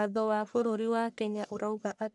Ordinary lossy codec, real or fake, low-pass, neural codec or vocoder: none; fake; 10.8 kHz; codec, 44.1 kHz, 1.7 kbps, Pupu-Codec